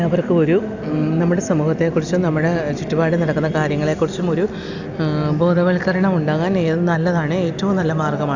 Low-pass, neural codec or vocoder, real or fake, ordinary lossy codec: 7.2 kHz; none; real; none